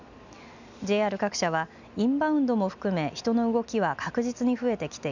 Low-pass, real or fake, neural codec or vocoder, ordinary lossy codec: 7.2 kHz; real; none; none